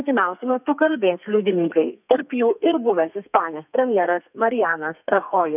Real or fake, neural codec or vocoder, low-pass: fake; codec, 32 kHz, 1.9 kbps, SNAC; 3.6 kHz